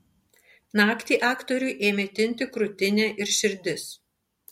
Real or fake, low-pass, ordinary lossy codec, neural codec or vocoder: real; 19.8 kHz; MP3, 64 kbps; none